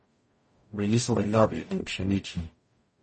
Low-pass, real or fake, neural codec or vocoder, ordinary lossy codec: 10.8 kHz; fake; codec, 44.1 kHz, 0.9 kbps, DAC; MP3, 32 kbps